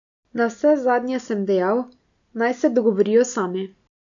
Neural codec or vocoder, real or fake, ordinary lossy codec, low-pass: none; real; none; 7.2 kHz